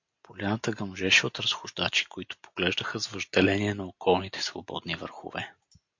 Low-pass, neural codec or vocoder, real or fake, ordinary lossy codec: 7.2 kHz; none; real; MP3, 48 kbps